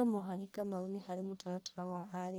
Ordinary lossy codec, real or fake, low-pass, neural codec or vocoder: none; fake; none; codec, 44.1 kHz, 1.7 kbps, Pupu-Codec